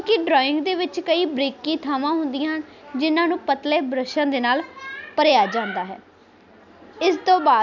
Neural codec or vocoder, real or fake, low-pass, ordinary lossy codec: none; real; 7.2 kHz; none